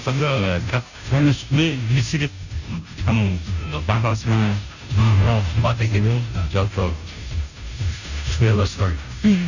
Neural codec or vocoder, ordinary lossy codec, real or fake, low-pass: codec, 16 kHz, 0.5 kbps, FunCodec, trained on Chinese and English, 25 frames a second; none; fake; 7.2 kHz